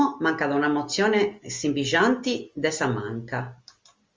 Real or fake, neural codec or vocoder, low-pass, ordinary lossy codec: real; none; 7.2 kHz; Opus, 32 kbps